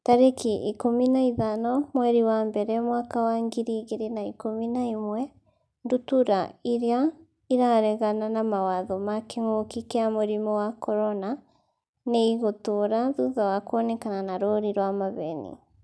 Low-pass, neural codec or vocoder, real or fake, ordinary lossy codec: none; none; real; none